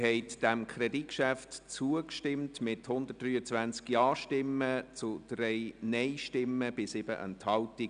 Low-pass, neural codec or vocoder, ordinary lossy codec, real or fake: 9.9 kHz; none; none; real